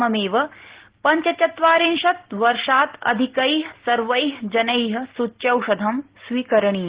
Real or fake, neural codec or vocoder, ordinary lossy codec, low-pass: real; none; Opus, 16 kbps; 3.6 kHz